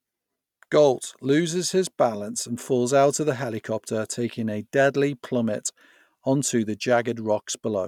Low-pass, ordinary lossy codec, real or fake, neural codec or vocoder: 19.8 kHz; none; real; none